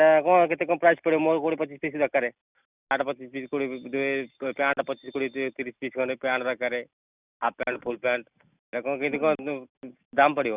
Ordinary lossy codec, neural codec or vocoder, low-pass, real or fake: Opus, 64 kbps; none; 3.6 kHz; real